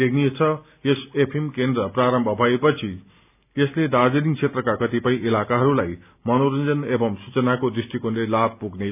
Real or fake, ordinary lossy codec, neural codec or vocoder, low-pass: real; none; none; 3.6 kHz